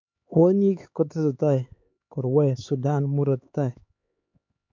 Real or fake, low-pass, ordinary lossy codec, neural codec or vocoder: fake; 7.2 kHz; MP3, 48 kbps; codec, 16 kHz, 4 kbps, X-Codec, HuBERT features, trained on LibriSpeech